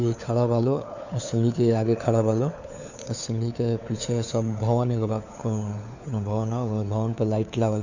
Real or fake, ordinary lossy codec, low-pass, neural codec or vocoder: fake; none; 7.2 kHz; codec, 16 kHz, 4 kbps, FunCodec, trained on LibriTTS, 50 frames a second